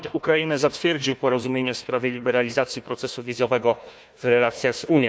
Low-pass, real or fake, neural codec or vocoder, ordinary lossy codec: none; fake; codec, 16 kHz, 1 kbps, FunCodec, trained on Chinese and English, 50 frames a second; none